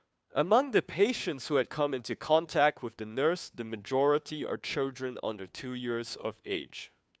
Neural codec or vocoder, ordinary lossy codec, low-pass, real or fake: codec, 16 kHz, 2 kbps, FunCodec, trained on Chinese and English, 25 frames a second; none; none; fake